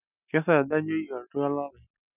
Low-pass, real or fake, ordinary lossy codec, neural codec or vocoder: 3.6 kHz; real; none; none